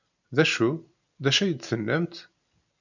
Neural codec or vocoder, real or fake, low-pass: none; real; 7.2 kHz